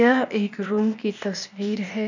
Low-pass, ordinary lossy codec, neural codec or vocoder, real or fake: 7.2 kHz; none; codec, 16 kHz, 0.8 kbps, ZipCodec; fake